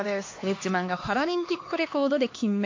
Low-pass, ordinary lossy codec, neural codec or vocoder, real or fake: 7.2 kHz; AAC, 48 kbps; codec, 16 kHz, 2 kbps, X-Codec, HuBERT features, trained on LibriSpeech; fake